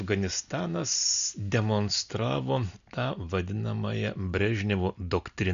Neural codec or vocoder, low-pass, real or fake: none; 7.2 kHz; real